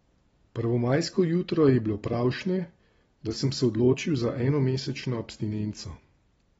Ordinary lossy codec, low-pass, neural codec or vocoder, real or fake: AAC, 24 kbps; 19.8 kHz; vocoder, 44.1 kHz, 128 mel bands every 256 samples, BigVGAN v2; fake